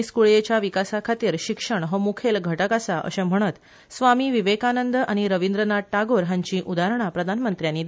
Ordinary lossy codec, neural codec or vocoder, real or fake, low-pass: none; none; real; none